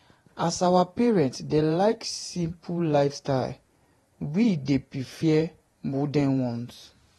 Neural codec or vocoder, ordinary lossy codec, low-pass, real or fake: vocoder, 48 kHz, 128 mel bands, Vocos; AAC, 32 kbps; 19.8 kHz; fake